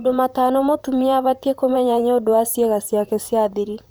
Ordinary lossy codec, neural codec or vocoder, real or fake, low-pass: none; vocoder, 44.1 kHz, 128 mel bands, Pupu-Vocoder; fake; none